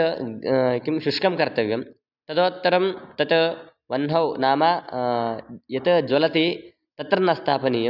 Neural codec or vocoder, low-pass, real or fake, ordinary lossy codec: none; 5.4 kHz; real; none